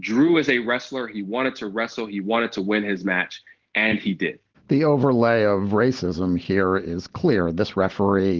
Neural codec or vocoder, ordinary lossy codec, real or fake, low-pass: none; Opus, 16 kbps; real; 7.2 kHz